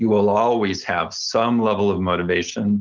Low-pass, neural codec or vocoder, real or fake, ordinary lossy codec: 7.2 kHz; none; real; Opus, 24 kbps